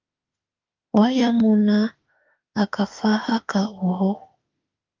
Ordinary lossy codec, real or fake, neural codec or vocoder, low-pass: Opus, 24 kbps; fake; autoencoder, 48 kHz, 32 numbers a frame, DAC-VAE, trained on Japanese speech; 7.2 kHz